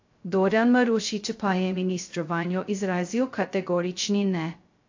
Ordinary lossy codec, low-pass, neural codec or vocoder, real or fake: AAC, 48 kbps; 7.2 kHz; codec, 16 kHz, 0.2 kbps, FocalCodec; fake